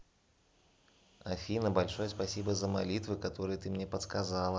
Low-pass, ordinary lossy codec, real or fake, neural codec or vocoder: none; none; real; none